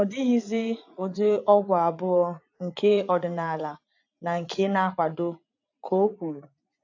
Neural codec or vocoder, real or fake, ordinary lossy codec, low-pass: vocoder, 44.1 kHz, 80 mel bands, Vocos; fake; AAC, 48 kbps; 7.2 kHz